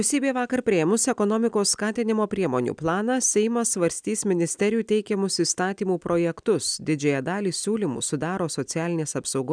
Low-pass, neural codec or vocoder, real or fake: 9.9 kHz; none; real